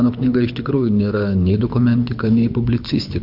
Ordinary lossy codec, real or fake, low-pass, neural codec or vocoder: MP3, 48 kbps; fake; 5.4 kHz; codec, 24 kHz, 6 kbps, HILCodec